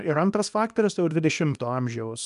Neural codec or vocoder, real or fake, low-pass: codec, 24 kHz, 0.9 kbps, WavTokenizer, small release; fake; 10.8 kHz